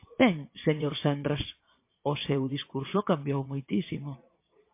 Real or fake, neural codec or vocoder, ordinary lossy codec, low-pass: fake; vocoder, 22.05 kHz, 80 mel bands, Vocos; MP3, 24 kbps; 3.6 kHz